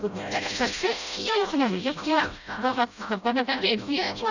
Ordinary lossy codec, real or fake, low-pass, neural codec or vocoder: none; fake; 7.2 kHz; codec, 16 kHz, 0.5 kbps, FreqCodec, smaller model